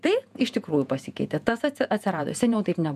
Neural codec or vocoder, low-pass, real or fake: vocoder, 48 kHz, 128 mel bands, Vocos; 14.4 kHz; fake